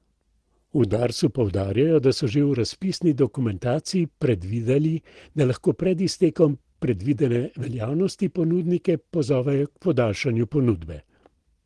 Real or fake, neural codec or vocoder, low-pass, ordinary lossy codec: real; none; 10.8 kHz; Opus, 16 kbps